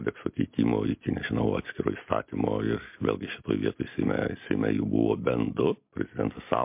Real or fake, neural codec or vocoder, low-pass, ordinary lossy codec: real; none; 3.6 kHz; MP3, 32 kbps